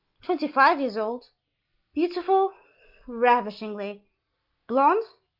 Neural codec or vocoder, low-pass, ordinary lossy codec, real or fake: none; 5.4 kHz; Opus, 32 kbps; real